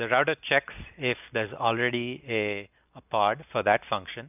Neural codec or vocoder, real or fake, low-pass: none; real; 3.6 kHz